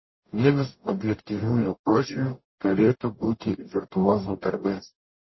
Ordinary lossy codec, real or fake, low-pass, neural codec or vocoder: MP3, 24 kbps; fake; 7.2 kHz; codec, 44.1 kHz, 0.9 kbps, DAC